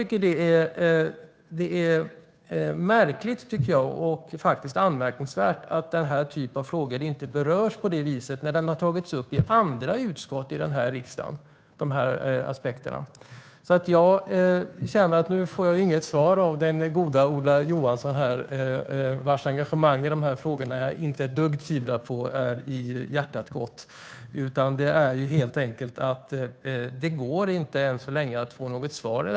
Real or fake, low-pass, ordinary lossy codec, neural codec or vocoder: fake; none; none; codec, 16 kHz, 2 kbps, FunCodec, trained on Chinese and English, 25 frames a second